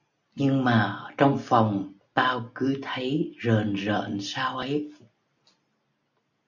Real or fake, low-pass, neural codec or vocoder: real; 7.2 kHz; none